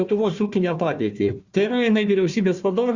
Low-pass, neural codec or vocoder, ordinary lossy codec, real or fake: 7.2 kHz; codec, 16 kHz, 1 kbps, FunCodec, trained on Chinese and English, 50 frames a second; Opus, 64 kbps; fake